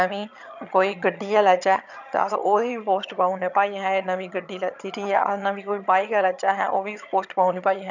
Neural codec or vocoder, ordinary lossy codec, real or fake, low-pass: vocoder, 22.05 kHz, 80 mel bands, HiFi-GAN; none; fake; 7.2 kHz